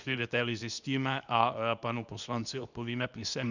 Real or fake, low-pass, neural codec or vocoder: fake; 7.2 kHz; codec, 24 kHz, 0.9 kbps, WavTokenizer, medium speech release version 2